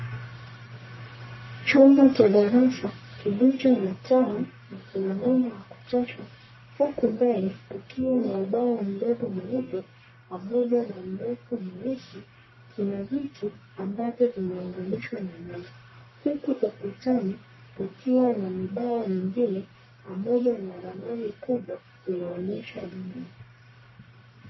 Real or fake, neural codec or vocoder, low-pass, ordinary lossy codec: fake; codec, 44.1 kHz, 1.7 kbps, Pupu-Codec; 7.2 kHz; MP3, 24 kbps